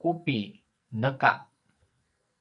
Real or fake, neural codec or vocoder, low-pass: fake; codec, 44.1 kHz, 2.6 kbps, SNAC; 10.8 kHz